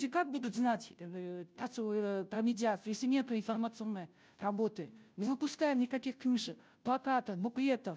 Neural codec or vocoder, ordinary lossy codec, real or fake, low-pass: codec, 16 kHz, 0.5 kbps, FunCodec, trained on Chinese and English, 25 frames a second; none; fake; none